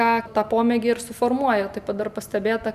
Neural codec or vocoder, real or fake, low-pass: none; real; 14.4 kHz